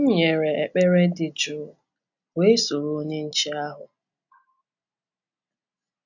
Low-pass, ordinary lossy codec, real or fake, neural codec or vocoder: 7.2 kHz; none; real; none